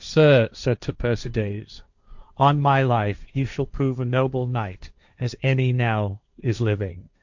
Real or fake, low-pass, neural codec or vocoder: fake; 7.2 kHz; codec, 16 kHz, 1.1 kbps, Voila-Tokenizer